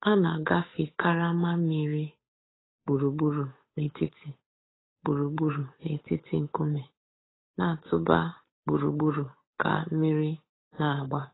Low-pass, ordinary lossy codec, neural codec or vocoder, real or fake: 7.2 kHz; AAC, 16 kbps; codec, 16 kHz, 8 kbps, FunCodec, trained on Chinese and English, 25 frames a second; fake